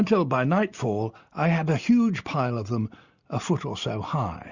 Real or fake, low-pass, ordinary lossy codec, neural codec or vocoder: real; 7.2 kHz; Opus, 64 kbps; none